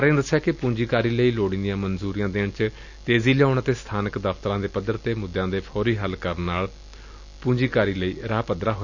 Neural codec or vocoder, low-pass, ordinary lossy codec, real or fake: none; 7.2 kHz; none; real